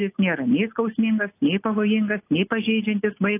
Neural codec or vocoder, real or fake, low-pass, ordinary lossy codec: none; real; 3.6 kHz; AAC, 24 kbps